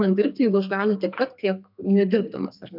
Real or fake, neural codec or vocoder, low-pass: fake; codec, 32 kHz, 1.9 kbps, SNAC; 5.4 kHz